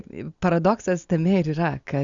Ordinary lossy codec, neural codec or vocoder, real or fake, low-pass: Opus, 64 kbps; none; real; 7.2 kHz